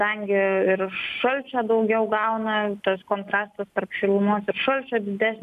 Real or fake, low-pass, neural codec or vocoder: real; 14.4 kHz; none